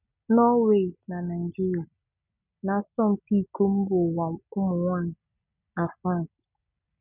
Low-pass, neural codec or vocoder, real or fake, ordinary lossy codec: 3.6 kHz; none; real; none